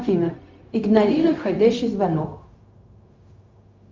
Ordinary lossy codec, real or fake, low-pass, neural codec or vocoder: Opus, 24 kbps; fake; 7.2 kHz; codec, 16 kHz, 0.4 kbps, LongCat-Audio-Codec